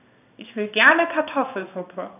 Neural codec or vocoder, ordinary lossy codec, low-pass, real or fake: codec, 16 kHz in and 24 kHz out, 1 kbps, XY-Tokenizer; none; 3.6 kHz; fake